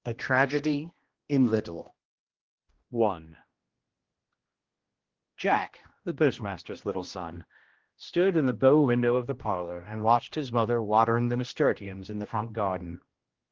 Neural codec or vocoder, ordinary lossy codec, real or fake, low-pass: codec, 16 kHz, 1 kbps, X-Codec, HuBERT features, trained on general audio; Opus, 16 kbps; fake; 7.2 kHz